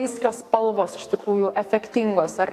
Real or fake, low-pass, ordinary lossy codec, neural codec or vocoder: fake; 14.4 kHz; AAC, 64 kbps; codec, 44.1 kHz, 2.6 kbps, SNAC